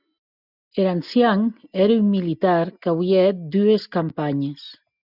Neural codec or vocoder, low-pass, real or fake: none; 5.4 kHz; real